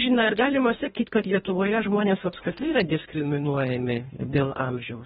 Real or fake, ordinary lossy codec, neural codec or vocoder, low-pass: fake; AAC, 16 kbps; codec, 24 kHz, 1.5 kbps, HILCodec; 10.8 kHz